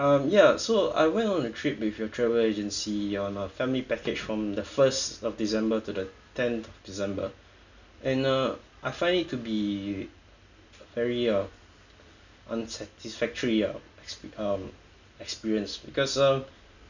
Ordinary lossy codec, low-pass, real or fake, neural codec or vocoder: none; 7.2 kHz; real; none